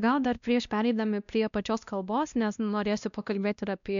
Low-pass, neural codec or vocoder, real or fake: 7.2 kHz; codec, 16 kHz, 1 kbps, X-Codec, WavLM features, trained on Multilingual LibriSpeech; fake